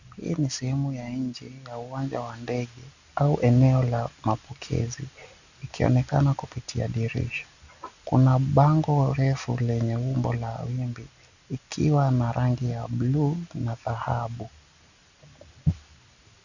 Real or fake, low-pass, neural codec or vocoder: real; 7.2 kHz; none